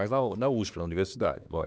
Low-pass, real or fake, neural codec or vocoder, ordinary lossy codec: none; fake; codec, 16 kHz, 2 kbps, X-Codec, HuBERT features, trained on LibriSpeech; none